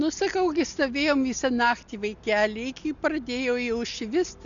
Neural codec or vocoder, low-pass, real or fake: none; 7.2 kHz; real